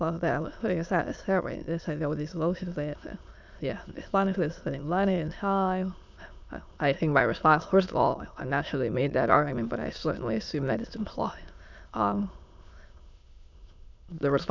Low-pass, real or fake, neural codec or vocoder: 7.2 kHz; fake; autoencoder, 22.05 kHz, a latent of 192 numbers a frame, VITS, trained on many speakers